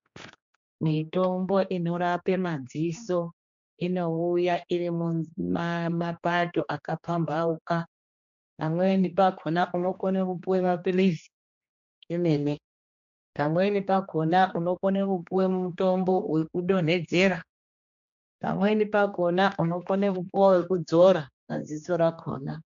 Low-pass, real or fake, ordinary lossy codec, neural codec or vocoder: 7.2 kHz; fake; MP3, 64 kbps; codec, 16 kHz, 2 kbps, X-Codec, HuBERT features, trained on general audio